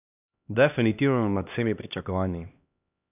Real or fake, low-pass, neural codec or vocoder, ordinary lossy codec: fake; 3.6 kHz; codec, 16 kHz, 1 kbps, X-Codec, HuBERT features, trained on LibriSpeech; none